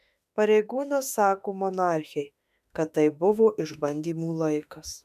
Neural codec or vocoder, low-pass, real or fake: autoencoder, 48 kHz, 32 numbers a frame, DAC-VAE, trained on Japanese speech; 14.4 kHz; fake